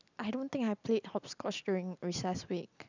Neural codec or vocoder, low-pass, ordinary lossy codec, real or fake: none; 7.2 kHz; none; real